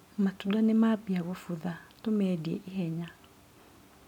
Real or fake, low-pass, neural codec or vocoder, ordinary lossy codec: real; 19.8 kHz; none; none